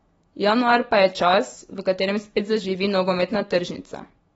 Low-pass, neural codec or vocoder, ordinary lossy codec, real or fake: 19.8 kHz; autoencoder, 48 kHz, 128 numbers a frame, DAC-VAE, trained on Japanese speech; AAC, 24 kbps; fake